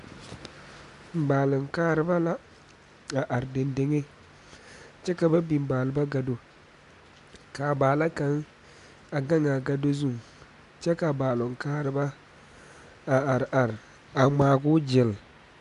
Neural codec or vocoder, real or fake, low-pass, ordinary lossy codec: none; real; 10.8 kHz; MP3, 96 kbps